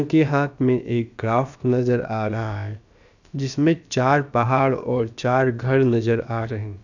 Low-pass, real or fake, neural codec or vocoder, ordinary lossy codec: 7.2 kHz; fake; codec, 16 kHz, about 1 kbps, DyCAST, with the encoder's durations; none